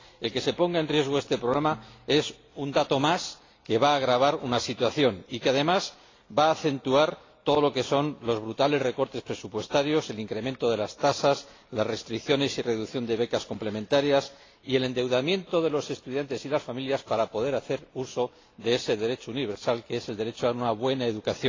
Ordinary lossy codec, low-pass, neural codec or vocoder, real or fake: AAC, 32 kbps; 7.2 kHz; none; real